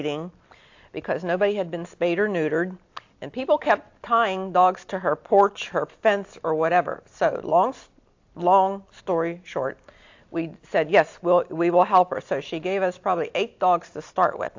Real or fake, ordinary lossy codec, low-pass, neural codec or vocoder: real; AAC, 48 kbps; 7.2 kHz; none